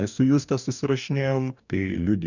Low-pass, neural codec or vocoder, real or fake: 7.2 kHz; codec, 44.1 kHz, 2.6 kbps, DAC; fake